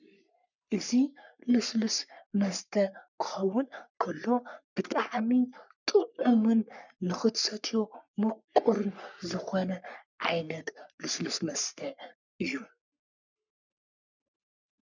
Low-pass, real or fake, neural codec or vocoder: 7.2 kHz; fake; codec, 44.1 kHz, 3.4 kbps, Pupu-Codec